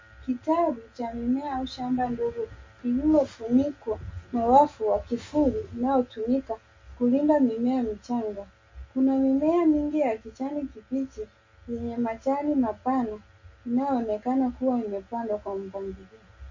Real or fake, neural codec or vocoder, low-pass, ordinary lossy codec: real; none; 7.2 kHz; MP3, 32 kbps